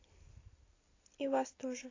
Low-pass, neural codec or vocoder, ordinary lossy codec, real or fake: 7.2 kHz; none; AAC, 32 kbps; real